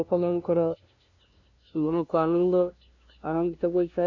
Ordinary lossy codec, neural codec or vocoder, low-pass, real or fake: none; codec, 16 kHz, 0.5 kbps, FunCodec, trained on LibriTTS, 25 frames a second; 7.2 kHz; fake